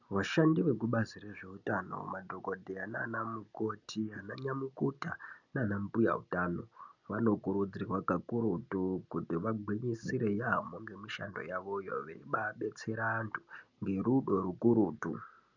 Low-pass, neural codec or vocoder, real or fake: 7.2 kHz; none; real